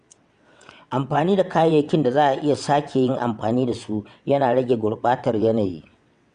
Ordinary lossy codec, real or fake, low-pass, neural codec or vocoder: Opus, 64 kbps; fake; 9.9 kHz; vocoder, 22.05 kHz, 80 mel bands, WaveNeXt